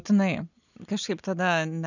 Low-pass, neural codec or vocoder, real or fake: 7.2 kHz; none; real